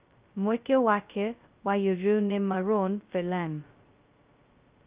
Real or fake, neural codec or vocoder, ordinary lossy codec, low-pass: fake; codec, 16 kHz, 0.2 kbps, FocalCodec; Opus, 24 kbps; 3.6 kHz